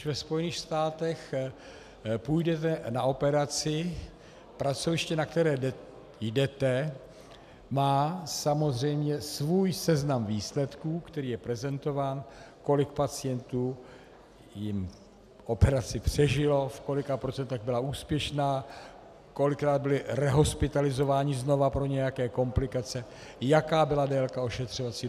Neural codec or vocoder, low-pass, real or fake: none; 14.4 kHz; real